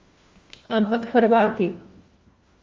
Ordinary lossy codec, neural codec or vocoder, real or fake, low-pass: Opus, 32 kbps; codec, 16 kHz, 1 kbps, FunCodec, trained on LibriTTS, 50 frames a second; fake; 7.2 kHz